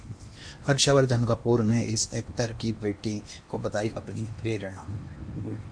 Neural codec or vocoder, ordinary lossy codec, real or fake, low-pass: codec, 16 kHz in and 24 kHz out, 0.8 kbps, FocalCodec, streaming, 65536 codes; MP3, 48 kbps; fake; 9.9 kHz